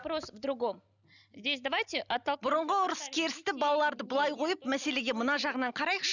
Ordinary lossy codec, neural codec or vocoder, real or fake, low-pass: none; none; real; 7.2 kHz